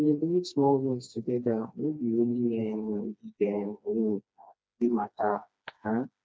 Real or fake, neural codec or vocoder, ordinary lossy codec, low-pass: fake; codec, 16 kHz, 2 kbps, FreqCodec, smaller model; none; none